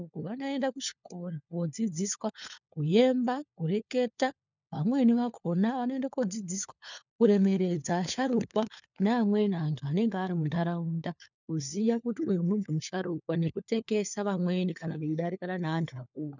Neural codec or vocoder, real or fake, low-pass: codec, 16 kHz, 4 kbps, FunCodec, trained on LibriTTS, 50 frames a second; fake; 7.2 kHz